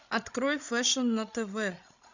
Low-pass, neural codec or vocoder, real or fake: 7.2 kHz; codec, 16 kHz, 16 kbps, FreqCodec, larger model; fake